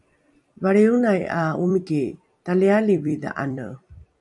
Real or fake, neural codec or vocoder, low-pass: fake; vocoder, 44.1 kHz, 128 mel bands every 256 samples, BigVGAN v2; 10.8 kHz